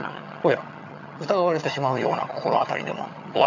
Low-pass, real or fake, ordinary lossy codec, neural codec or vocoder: 7.2 kHz; fake; none; vocoder, 22.05 kHz, 80 mel bands, HiFi-GAN